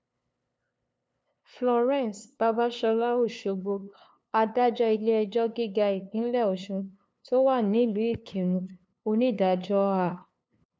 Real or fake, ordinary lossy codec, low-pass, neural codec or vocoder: fake; none; none; codec, 16 kHz, 2 kbps, FunCodec, trained on LibriTTS, 25 frames a second